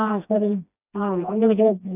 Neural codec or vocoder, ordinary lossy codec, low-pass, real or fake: codec, 16 kHz, 1 kbps, FreqCodec, smaller model; none; 3.6 kHz; fake